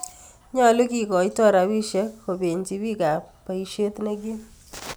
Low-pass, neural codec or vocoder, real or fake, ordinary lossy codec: none; none; real; none